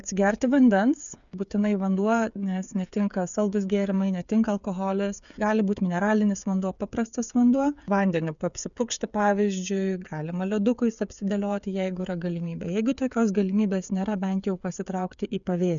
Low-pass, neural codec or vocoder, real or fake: 7.2 kHz; codec, 16 kHz, 8 kbps, FreqCodec, smaller model; fake